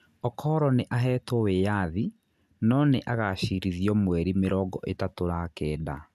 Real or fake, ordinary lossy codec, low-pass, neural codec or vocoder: real; none; 14.4 kHz; none